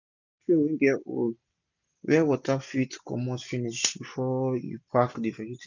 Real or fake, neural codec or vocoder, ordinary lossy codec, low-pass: real; none; none; 7.2 kHz